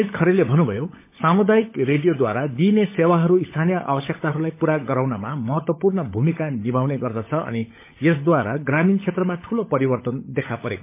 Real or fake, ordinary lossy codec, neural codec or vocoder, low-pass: fake; MP3, 24 kbps; codec, 16 kHz, 16 kbps, FunCodec, trained on LibriTTS, 50 frames a second; 3.6 kHz